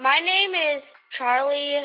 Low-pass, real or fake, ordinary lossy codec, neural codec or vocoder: 5.4 kHz; real; Opus, 64 kbps; none